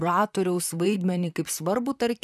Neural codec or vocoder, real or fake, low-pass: vocoder, 44.1 kHz, 128 mel bands, Pupu-Vocoder; fake; 14.4 kHz